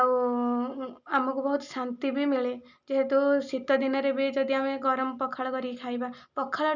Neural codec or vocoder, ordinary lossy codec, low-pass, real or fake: none; none; 7.2 kHz; real